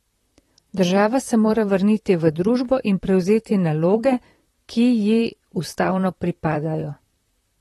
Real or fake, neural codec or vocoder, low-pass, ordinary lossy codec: fake; vocoder, 44.1 kHz, 128 mel bands, Pupu-Vocoder; 19.8 kHz; AAC, 32 kbps